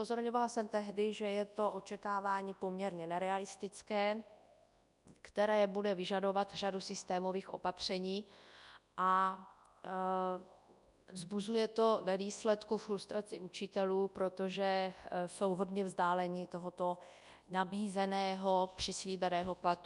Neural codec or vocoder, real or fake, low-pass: codec, 24 kHz, 0.9 kbps, WavTokenizer, large speech release; fake; 10.8 kHz